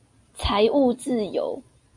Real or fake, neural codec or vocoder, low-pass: real; none; 10.8 kHz